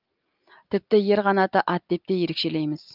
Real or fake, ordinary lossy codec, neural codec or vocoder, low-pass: real; Opus, 32 kbps; none; 5.4 kHz